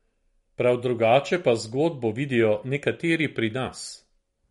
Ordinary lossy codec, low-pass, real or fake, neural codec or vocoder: MP3, 48 kbps; 19.8 kHz; real; none